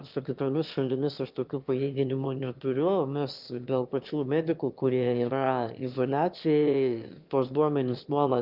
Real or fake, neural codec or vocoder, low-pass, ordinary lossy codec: fake; autoencoder, 22.05 kHz, a latent of 192 numbers a frame, VITS, trained on one speaker; 5.4 kHz; Opus, 24 kbps